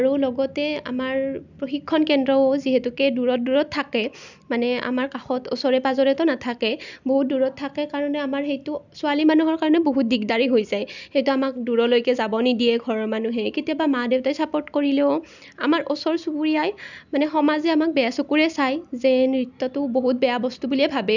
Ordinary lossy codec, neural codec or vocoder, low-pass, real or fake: none; none; 7.2 kHz; real